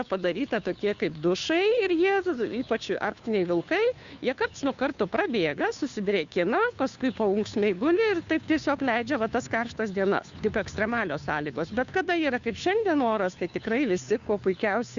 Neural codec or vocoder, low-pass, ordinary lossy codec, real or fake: codec, 16 kHz, 2 kbps, FunCodec, trained on Chinese and English, 25 frames a second; 7.2 kHz; Opus, 64 kbps; fake